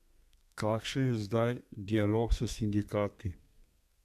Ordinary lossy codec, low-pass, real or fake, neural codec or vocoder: MP3, 96 kbps; 14.4 kHz; fake; codec, 32 kHz, 1.9 kbps, SNAC